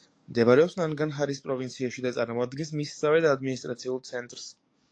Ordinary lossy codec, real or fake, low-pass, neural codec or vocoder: AAC, 48 kbps; fake; 9.9 kHz; codec, 44.1 kHz, 7.8 kbps, DAC